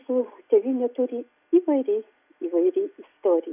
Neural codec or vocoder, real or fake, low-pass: none; real; 3.6 kHz